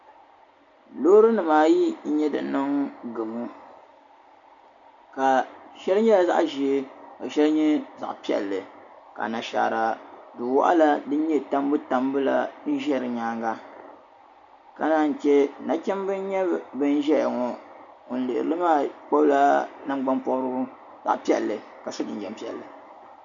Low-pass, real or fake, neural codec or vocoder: 7.2 kHz; real; none